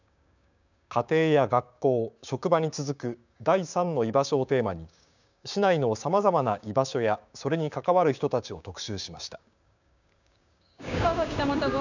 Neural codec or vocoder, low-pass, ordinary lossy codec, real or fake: codec, 16 kHz, 6 kbps, DAC; 7.2 kHz; none; fake